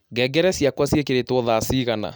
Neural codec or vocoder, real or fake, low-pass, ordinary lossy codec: none; real; none; none